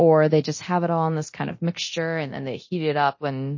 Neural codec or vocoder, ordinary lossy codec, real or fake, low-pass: codec, 24 kHz, 0.9 kbps, DualCodec; MP3, 32 kbps; fake; 7.2 kHz